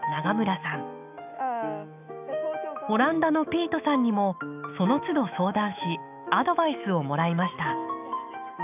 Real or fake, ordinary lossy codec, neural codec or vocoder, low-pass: fake; none; autoencoder, 48 kHz, 128 numbers a frame, DAC-VAE, trained on Japanese speech; 3.6 kHz